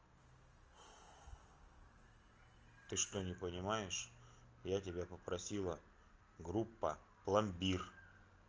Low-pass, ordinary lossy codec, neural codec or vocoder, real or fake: 7.2 kHz; Opus, 24 kbps; none; real